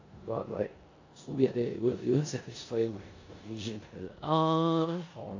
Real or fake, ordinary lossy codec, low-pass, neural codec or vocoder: fake; AAC, 48 kbps; 7.2 kHz; codec, 16 kHz in and 24 kHz out, 0.9 kbps, LongCat-Audio-Codec, four codebook decoder